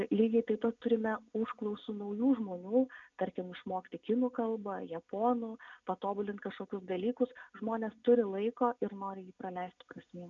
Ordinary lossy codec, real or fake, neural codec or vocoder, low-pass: MP3, 64 kbps; real; none; 7.2 kHz